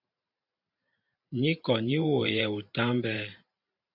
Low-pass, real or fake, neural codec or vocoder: 5.4 kHz; fake; vocoder, 44.1 kHz, 128 mel bands every 512 samples, BigVGAN v2